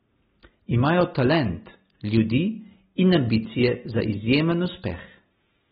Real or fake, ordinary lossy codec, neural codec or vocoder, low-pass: real; AAC, 16 kbps; none; 19.8 kHz